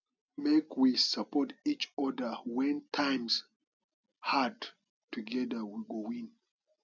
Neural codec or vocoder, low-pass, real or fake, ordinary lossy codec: none; 7.2 kHz; real; none